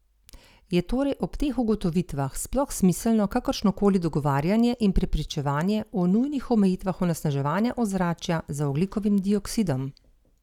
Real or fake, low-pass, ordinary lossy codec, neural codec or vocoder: real; 19.8 kHz; none; none